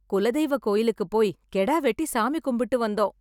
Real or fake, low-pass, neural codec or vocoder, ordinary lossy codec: real; 19.8 kHz; none; none